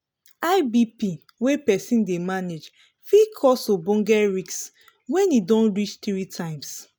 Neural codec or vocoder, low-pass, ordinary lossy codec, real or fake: none; none; none; real